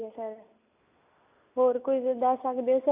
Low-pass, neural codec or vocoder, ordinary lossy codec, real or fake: 3.6 kHz; none; AAC, 24 kbps; real